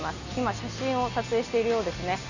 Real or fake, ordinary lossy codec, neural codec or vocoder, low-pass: real; none; none; 7.2 kHz